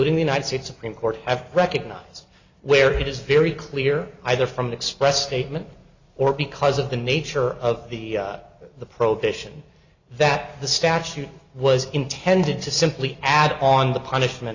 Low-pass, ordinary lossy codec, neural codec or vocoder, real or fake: 7.2 kHz; Opus, 64 kbps; none; real